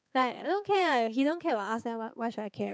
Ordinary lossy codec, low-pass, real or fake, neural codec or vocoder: none; none; fake; codec, 16 kHz, 4 kbps, X-Codec, HuBERT features, trained on balanced general audio